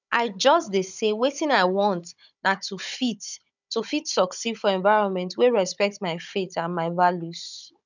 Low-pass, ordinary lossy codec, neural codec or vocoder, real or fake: 7.2 kHz; none; codec, 16 kHz, 16 kbps, FunCodec, trained on Chinese and English, 50 frames a second; fake